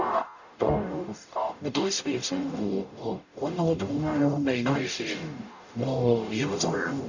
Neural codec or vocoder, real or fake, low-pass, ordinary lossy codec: codec, 44.1 kHz, 0.9 kbps, DAC; fake; 7.2 kHz; none